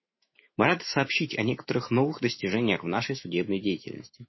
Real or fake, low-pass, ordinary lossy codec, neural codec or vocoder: fake; 7.2 kHz; MP3, 24 kbps; autoencoder, 48 kHz, 128 numbers a frame, DAC-VAE, trained on Japanese speech